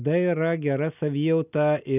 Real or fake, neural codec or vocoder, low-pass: real; none; 3.6 kHz